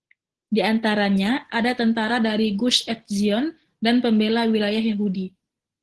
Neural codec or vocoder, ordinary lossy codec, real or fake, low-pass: none; Opus, 16 kbps; real; 10.8 kHz